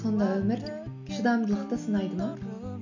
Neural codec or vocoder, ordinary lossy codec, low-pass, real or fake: none; none; 7.2 kHz; real